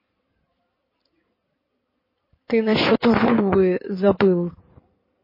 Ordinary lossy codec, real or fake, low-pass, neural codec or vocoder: MP3, 24 kbps; fake; 5.4 kHz; codec, 16 kHz in and 24 kHz out, 2.2 kbps, FireRedTTS-2 codec